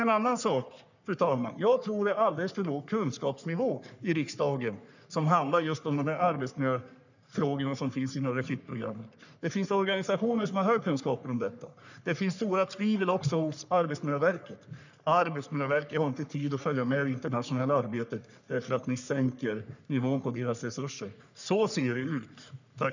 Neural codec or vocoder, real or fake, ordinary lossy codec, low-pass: codec, 44.1 kHz, 3.4 kbps, Pupu-Codec; fake; none; 7.2 kHz